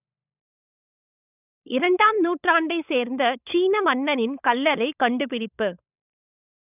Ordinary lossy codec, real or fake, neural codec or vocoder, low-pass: none; fake; codec, 16 kHz, 16 kbps, FunCodec, trained on LibriTTS, 50 frames a second; 3.6 kHz